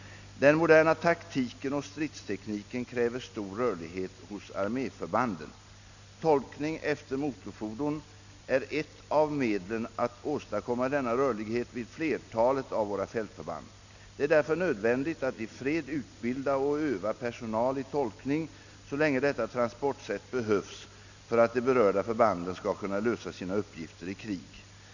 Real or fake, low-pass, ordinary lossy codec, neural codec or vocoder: real; 7.2 kHz; none; none